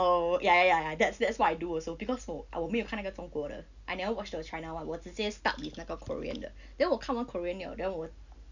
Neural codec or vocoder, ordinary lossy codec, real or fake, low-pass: none; none; real; 7.2 kHz